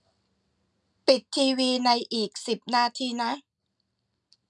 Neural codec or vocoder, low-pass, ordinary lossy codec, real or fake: none; 10.8 kHz; none; real